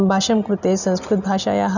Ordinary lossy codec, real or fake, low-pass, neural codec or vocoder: none; real; 7.2 kHz; none